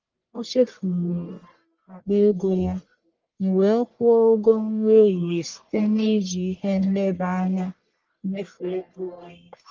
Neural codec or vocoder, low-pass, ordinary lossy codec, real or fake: codec, 44.1 kHz, 1.7 kbps, Pupu-Codec; 7.2 kHz; Opus, 32 kbps; fake